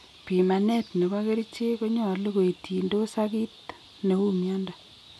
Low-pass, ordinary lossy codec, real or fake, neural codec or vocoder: none; none; real; none